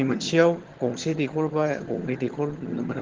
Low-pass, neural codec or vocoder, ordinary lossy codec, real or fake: 7.2 kHz; vocoder, 22.05 kHz, 80 mel bands, HiFi-GAN; Opus, 16 kbps; fake